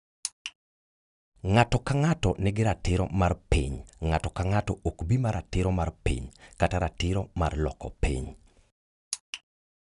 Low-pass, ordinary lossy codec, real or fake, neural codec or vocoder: 10.8 kHz; none; real; none